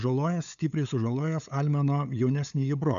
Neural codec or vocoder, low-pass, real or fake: codec, 16 kHz, 8 kbps, FunCodec, trained on LibriTTS, 25 frames a second; 7.2 kHz; fake